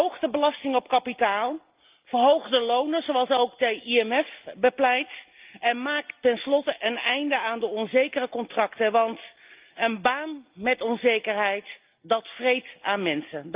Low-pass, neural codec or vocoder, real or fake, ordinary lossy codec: 3.6 kHz; none; real; Opus, 32 kbps